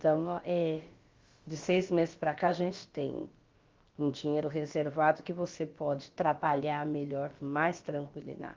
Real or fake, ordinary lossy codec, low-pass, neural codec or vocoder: fake; Opus, 32 kbps; 7.2 kHz; codec, 16 kHz, about 1 kbps, DyCAST, with the encoder's durations